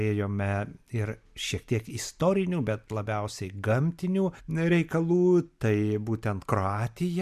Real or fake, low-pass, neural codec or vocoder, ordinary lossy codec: real; 14.4 kHz; none; MP3, 96 kbps